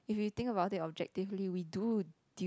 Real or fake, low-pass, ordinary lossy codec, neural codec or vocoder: real; none; none; none